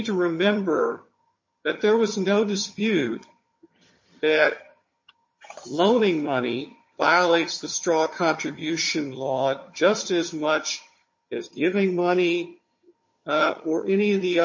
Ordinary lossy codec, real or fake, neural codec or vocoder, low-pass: MP3, 32 kbps; fake; vocoder, 22.05 kHz, 80 mel bands, HiFi-GAN; 7.2 kHz